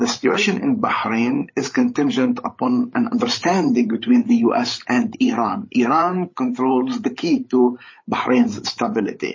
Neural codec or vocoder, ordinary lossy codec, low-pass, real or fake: codec, 16 kHz, 8 kbps, FreqCodec, larger model; MP3, 32 kbps; 7.2 kHz; fake